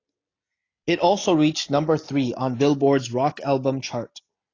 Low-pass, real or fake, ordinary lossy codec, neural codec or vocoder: 7.2 kHz; real; AAC, 32 kbps; none